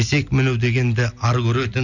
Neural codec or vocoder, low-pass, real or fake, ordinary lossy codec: none; 7.2 kHz; real; none